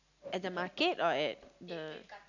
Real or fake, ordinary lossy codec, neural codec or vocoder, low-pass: real; none; none; 7.2 kHz